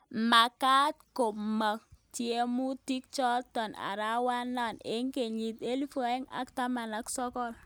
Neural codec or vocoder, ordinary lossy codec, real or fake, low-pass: none; none; real; none